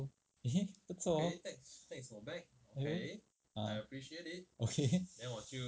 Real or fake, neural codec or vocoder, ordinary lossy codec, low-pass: real; none; none; none